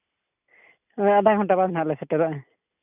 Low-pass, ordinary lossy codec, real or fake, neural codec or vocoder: 3.6 kHz; none; real; none